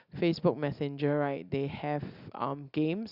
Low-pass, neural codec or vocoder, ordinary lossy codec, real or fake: 5.4 kHz; none; Opus, 64 kbps; real